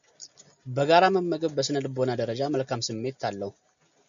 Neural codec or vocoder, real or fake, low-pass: none; real; 7.2 kHz